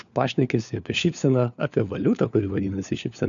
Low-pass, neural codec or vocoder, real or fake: 7.2 kHz; codec, 16 kHz, 4 kbps, FunCodec, trained on LibriTTS, 50 frames a second; fake